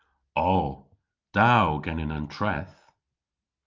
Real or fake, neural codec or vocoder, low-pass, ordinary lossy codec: real; none; 7.2 kHz; Opus, 24 kbps